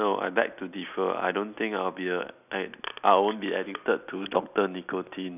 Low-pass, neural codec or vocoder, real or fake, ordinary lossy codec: 3.6 kHz; none; real; none